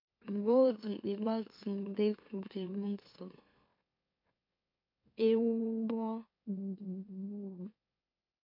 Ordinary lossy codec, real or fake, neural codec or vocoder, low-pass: MP3, 32 kbps; fake; autoencoder, 44.1 kHz, a latent of 192 numbers a frame, MeloTTS; 5.4 kHz